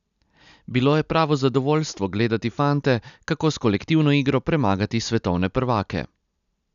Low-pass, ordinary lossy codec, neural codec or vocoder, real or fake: 7.2 kHz; none; none; real